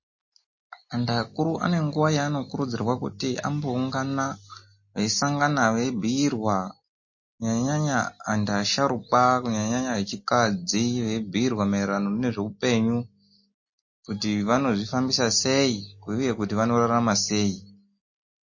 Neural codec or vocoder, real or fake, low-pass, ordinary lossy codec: none; real; 7.2 kHz; MP3, 32 kbps